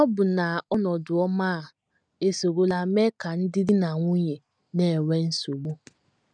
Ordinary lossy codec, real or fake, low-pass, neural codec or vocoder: none; real; 9.9 kHz; none